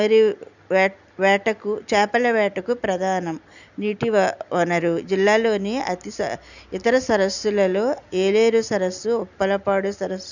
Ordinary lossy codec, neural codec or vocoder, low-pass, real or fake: none; none; 7.2 kHz; real